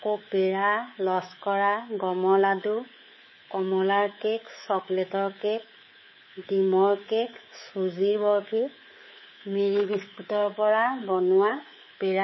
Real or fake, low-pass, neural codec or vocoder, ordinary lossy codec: fake; 7.2 kHz; codec, 24 kHz, 3.1 kbps, DualCodec; MP3, 24 kbps